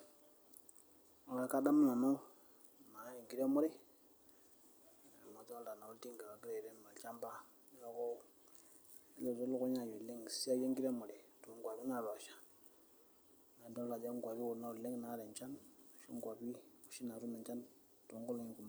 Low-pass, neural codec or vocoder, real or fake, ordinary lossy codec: none; none; real; none